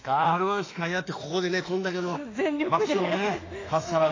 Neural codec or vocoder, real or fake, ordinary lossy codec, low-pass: autoencoder, 48 kHz, 32 numbers a frame, DAC-VAE, trained on Japanese speech; fake; AAC, 32 kbps; 7.2 kHz